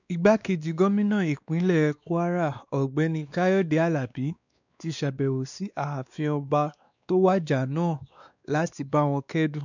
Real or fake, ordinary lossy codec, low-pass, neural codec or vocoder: fake; none; 7.2 kHz; codec, 16 kHz, 2 kbps, X-Codec, WavLM features, trained on Multilingual LibriSpeech